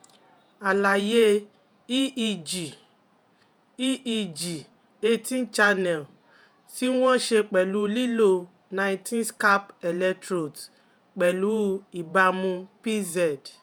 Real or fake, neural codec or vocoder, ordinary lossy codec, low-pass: fake; vocoder, 48 kHz, 128 mel bands, Vocos; none; none